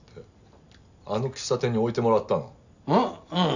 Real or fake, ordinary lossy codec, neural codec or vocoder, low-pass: real; none; none; 7.2 kHz